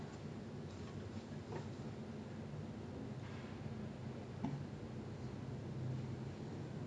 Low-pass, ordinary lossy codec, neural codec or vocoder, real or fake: 9.9 kHz; MP3, 96 kbps; none; real